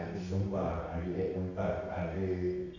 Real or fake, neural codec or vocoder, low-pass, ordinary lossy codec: fake; codec, 24 kHz, 0.9 kbps, WavTokenizer, medium music audio release; 7.2 kHz; none